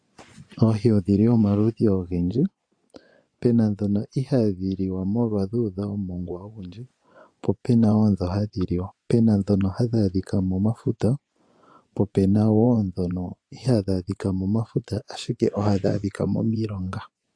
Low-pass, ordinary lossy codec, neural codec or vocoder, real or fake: 9.9 kHz; AAC, 64 kbps; vocoder, 24 kHz, 100 mel bands, Vocos; fake